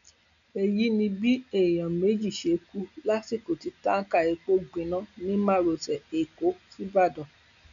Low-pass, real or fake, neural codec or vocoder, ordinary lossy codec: 7.2 kHz; real; none; none